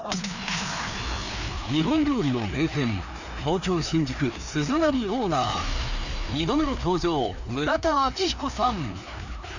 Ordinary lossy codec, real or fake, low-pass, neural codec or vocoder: none; fake; 7.2 kHz; codec, 16 kHz, 2 kbps, FreqCodec, larger model